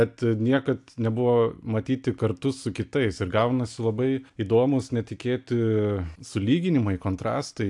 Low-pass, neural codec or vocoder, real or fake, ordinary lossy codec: 10.8 kHz; none; real; AAC, 64 kbps